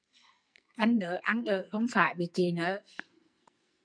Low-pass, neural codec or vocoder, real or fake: 9.9 kHz; codec, 44.1 kHz, 2.6 kbps, SNAC; fake